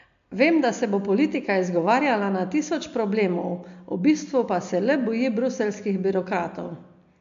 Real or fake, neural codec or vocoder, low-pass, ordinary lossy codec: real; none; 7.2 kHz; MP3, 64 kbps